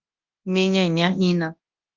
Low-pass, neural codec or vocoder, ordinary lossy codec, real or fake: 7.2 kHz; codec, 24 kHz, 0.9 kbps, WavTokenizer, large speech release; Opus, 32 kbps; fake